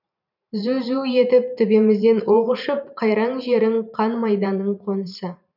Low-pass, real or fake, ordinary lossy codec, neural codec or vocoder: 5.4 kHz; fake; none; vocoder, 44.1 kHz, 128 mel bands every 512 samples, BigVGAN v2